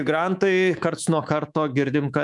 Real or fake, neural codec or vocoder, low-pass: fake; codec, 24 kHz, 3.1 kbps, DualCodec; 10.8 kHz